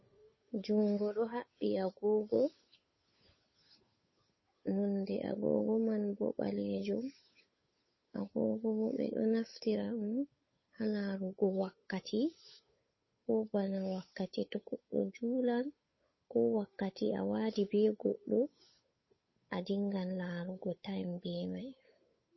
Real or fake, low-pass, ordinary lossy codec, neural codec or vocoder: fake; 7.2 kHz; MP3, 24 kbps; codec, 16 kHz, 8 kbps, FunCodec, trained on Chinese and English, 25 frames a second